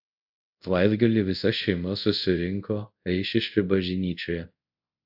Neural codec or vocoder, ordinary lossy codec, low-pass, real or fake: codec, 24 kHz, 0.5 kbps, DualCodec; AAC, 48 kbps; 5.4 kHz; fake